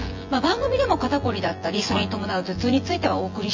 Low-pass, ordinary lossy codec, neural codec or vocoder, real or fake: 7.2 kHz; MP3, 48 kbps; vocoder, 24 kHz, 100 mel bands, Vocos; fake